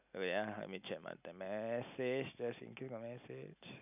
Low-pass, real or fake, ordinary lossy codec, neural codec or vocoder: 3.6 kHz; real; none; none